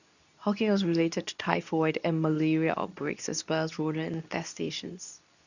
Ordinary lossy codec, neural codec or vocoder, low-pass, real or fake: none; codec, 24 kHz, 0.9 kbps, WavTokenizer, medium speech release version 2; 7.2 kHz; fake